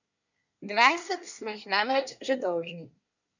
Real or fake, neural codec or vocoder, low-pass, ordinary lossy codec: fake; codec, 24 kHz, 1 kbps, SNAC; 7.2 kHz; none